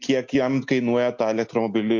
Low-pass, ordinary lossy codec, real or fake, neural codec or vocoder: 7.2 kHz; MP3, 48 kbps; real; none